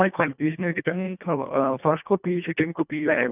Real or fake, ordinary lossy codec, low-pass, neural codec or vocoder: fake; none; 3.6 kHz; codec, 24 kHz, 1.5 kbps, HILCodec